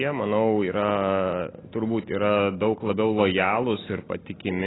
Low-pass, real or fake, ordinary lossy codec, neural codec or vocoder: 7.2 kHz; real; AAC, 16 kbps; none